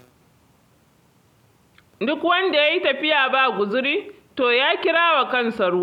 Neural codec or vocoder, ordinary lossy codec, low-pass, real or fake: none; none; 19.8 kHz; real